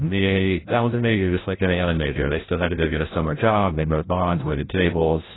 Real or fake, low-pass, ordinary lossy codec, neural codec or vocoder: fake; 7.2 kHz; AAC, 16 kbps; codec, 16 kHz, 0.5 kbps, FreqCodec, larger model